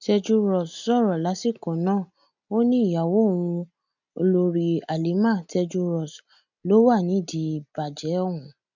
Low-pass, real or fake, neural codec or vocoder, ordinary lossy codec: 7.2 kHz; real; none; none